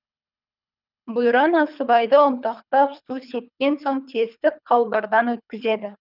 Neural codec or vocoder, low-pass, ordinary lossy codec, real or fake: codec, 24 kHz, 3 kbps, HILCodec; 5.4 kHz; none; fake